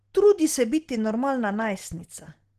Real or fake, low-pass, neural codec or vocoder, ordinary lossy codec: real; 14.4 kHz; none; Opus, 16 kbps